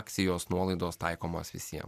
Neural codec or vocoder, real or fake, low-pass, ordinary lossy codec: none; real; 14.4 kHz; MP3, 96 kbps